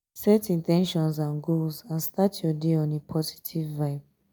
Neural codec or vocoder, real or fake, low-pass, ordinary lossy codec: none; real; none; none